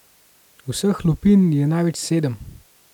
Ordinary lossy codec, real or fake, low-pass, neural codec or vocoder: none; real; 19.8 kHz; none